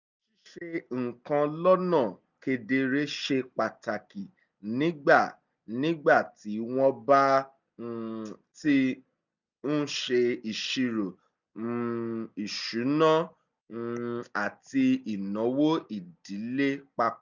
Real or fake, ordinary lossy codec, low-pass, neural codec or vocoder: real; none; 7.2 kHz; none